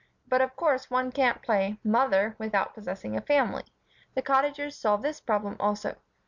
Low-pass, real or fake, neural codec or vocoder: 7.2 kHz; real; none